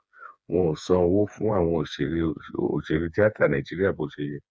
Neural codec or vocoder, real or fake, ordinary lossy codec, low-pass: codec, 16 kHz, 4 kbps, FreqCodec, smaller model; fake; none; none